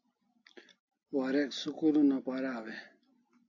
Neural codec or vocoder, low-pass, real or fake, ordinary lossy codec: none; 7.2 kHz; real; AAC, 48 kbps